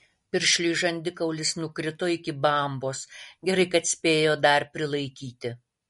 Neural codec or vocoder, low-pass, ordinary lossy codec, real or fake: none; 19.8 kHz; MP3, 48 kbps; real